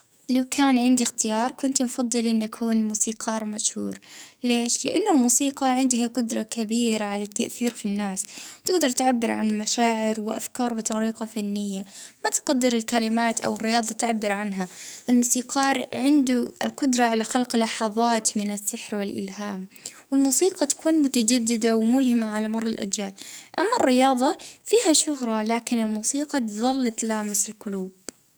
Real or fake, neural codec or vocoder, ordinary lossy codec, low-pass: fake; codec, 44.1 kHz, 2.6 kbps, SNAC; none; none